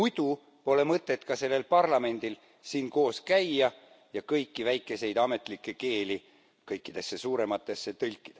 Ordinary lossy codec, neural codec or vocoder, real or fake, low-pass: none; none; real; none